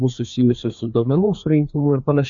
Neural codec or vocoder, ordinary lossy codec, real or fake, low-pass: codec, 16 kHz, 2 kbps, FreqCodec, larger model; AAC, 64 kbps; fake; 7.2 kHz